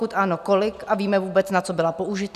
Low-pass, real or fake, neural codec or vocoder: 14.4 kHz; real; none